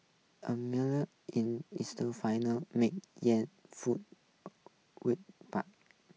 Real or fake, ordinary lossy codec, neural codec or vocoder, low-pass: real; none; none; none